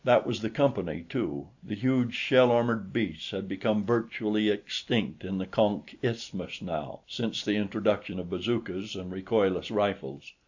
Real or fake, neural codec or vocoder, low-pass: real; none; 7.2 kHz